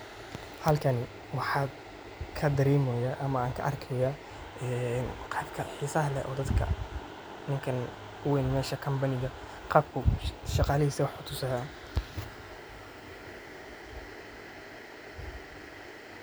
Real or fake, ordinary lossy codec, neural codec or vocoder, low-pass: real; none; none; none